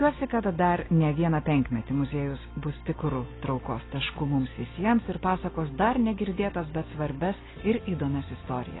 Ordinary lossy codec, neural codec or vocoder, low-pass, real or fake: AAC, 16 kbps; none; 7.2 kHz; real